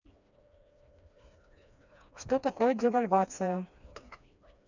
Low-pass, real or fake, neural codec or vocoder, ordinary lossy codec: 7.2 kHz; fake; codec, 16 kHz, 2 kbps, FreqCodec, smaller model; none